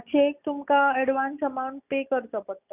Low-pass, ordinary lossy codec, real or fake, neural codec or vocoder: 3.6 kHz; none; real; none